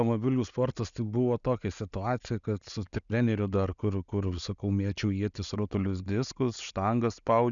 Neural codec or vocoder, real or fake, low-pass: none; real; 7.2 kHz